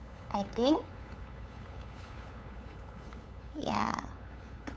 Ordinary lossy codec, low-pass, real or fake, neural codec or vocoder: none; none; fake; codec, 16 kHz, 8 kbps, FunCodec, trained on LibriTTS, 25 frames a second